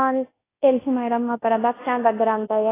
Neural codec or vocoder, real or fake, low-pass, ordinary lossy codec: codec, 24 kHz, 0.9 kbps, WavTokenizer, large speech release; fake; 3.6 kHz; AAC, 16 kbps